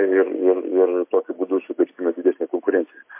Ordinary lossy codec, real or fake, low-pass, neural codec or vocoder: MP3, 24 kbps; real; 3.6 kHz; none